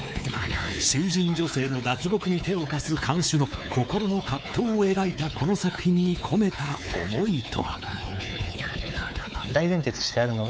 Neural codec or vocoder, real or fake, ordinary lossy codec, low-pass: codec, 16 kHz, 4 kbps, X-Codec, WavLM features, trained on Multilingual LibriSpeech; fake; none; none